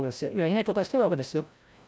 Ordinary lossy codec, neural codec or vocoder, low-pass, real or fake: none; codec, 16 kHz, 0.5 kbps, FreqCodec, larger model; none; fake